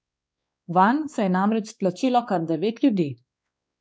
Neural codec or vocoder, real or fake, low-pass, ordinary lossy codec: codec, 16 kHz, 2 kbps, X-Codec, WavLM features, trained on Multilingual LibriSpeech; fake; none; none